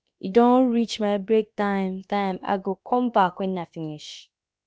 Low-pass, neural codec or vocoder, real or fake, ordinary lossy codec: none; codec, 16 kHz, about 1 kbps, DyCAST, with the encoder's durations; fake; none